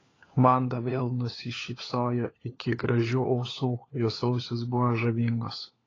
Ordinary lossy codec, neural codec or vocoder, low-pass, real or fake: AAC, 32 kbps; codec, 16 kHz, 4 kbps, FunCodec, trained on LibriTTS, 50 frames a second; 7.2 kHz; fake